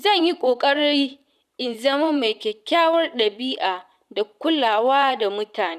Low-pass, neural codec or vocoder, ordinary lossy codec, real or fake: 14.4 kHz; vocoder, 44.1 kHz, 128 mel bands, Pupu-Vocoder; none; fake